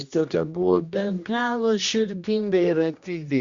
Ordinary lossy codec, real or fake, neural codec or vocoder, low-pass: Opus, 64 kbps; fake; codec, 16 kHz, 1 kbps, X-Codec, HuBERT features, trained on general audio; 7.2 kHz